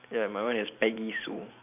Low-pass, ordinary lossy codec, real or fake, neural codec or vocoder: 3.6 kHz; none; fake; vocoder, 44.1 kHz, 128 mel bands every 512 samples, BigVGAN v2